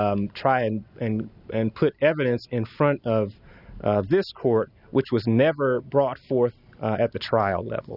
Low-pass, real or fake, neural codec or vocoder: 5.4 kHz; real; none